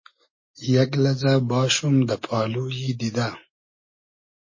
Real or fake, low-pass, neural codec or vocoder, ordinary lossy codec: real; 7.2 kHz; none; MP3, 32 kbps